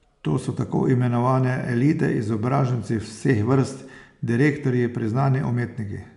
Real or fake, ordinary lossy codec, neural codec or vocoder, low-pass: real; none; none; 10.8 kHz